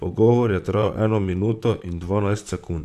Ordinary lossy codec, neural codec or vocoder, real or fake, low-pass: none; vocoder, 44.1 kHz, 128 mel bands, Pupu-Vocoder; fake; 14.4 kHz